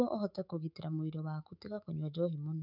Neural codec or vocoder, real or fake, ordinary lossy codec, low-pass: autoencoder, 48 kHz, 128 numbers a frame, DAC-VAE, trained on Japanese speech; fake; none; 5.4 kHz